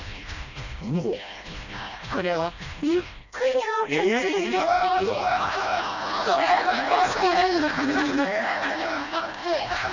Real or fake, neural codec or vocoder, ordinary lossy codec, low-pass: fake; codec, 16 kHz, 1 kbps, FreqCodec, smaller model; none; 7.2 kHz